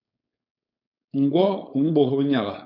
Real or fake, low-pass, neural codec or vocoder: fake; 5.4 kHz; codec, 16 kHz, 4.8 kbps, FACodec